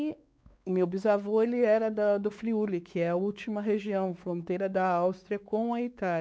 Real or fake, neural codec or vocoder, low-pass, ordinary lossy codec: fake; codec, 16 kHz, 4 kbps, X-Codec, WavLM features, trained on Multilingual LibriSpeech; none; none